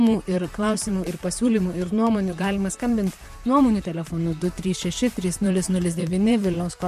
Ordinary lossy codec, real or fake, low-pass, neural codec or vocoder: MP3, 64 kbps; fake; 14.4 kHz; vocoder, 44.1 kHz, 128 mel bands, Pupu-Vocoder